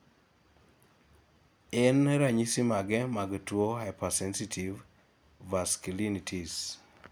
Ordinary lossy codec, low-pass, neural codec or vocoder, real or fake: none; none; none; real